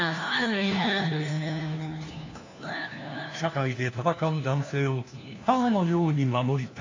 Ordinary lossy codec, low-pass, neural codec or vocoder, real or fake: AAC, 48 kbps; 7.2 kHz; codec, 16 kHz, 1 kbps, FunCodec, trained on LibriTTS, 50 frames a second; fake